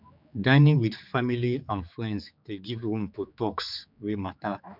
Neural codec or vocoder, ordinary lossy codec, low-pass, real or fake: codec, 16 kHz, 4 kbps, X-Codec, HuBERT features, trained on general audio; none; 5.4 kHz; fake